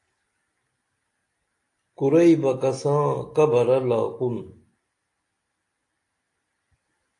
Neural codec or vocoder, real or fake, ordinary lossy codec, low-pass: none; real; AAC, 48 kbps; 10.8 kHz